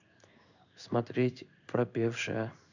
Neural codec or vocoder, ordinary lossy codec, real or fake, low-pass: codec, 16 kHz in and 24 kHz out, 1 kbps, XY-Tokenizer; none; fake; 7.2 kHz